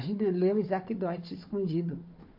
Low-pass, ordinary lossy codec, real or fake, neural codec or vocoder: 5.4 kHz; MP3, 32 kbps; fake; codec, 16 kHz, 4 kbps, FunCodec, trained on Chinese and English, 50 frames a second